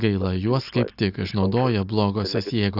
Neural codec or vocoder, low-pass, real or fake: none; 5.4 kHz; real